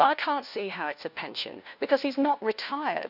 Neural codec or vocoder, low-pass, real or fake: codec, 16 kHz, 1 kbps, FunCodec, trained on LibriTTS, 50 frames a second; 5.4 kHz; fake